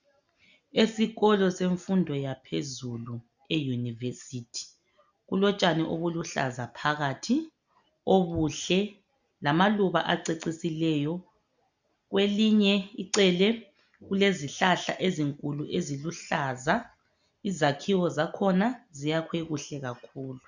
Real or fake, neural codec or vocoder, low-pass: real; none; 7.2 kHz